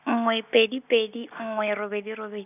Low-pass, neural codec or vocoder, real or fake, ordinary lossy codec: 3.6 kHz; none; real; none